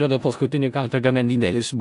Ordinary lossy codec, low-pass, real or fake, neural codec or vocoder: AAC, 64 kbps; 10.8 kHz; fake; codec, 16 kHz in and 24 kHz out, 0.4 kbps, LongCat-Audio-Codec, four codebook decoder